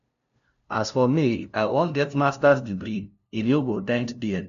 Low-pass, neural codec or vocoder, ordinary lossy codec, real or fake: 7.2 kHz; codec, 16 kHz, 0.5 kbps, FunCodec, trained on LibriTTS, 25 frames a second; none; fake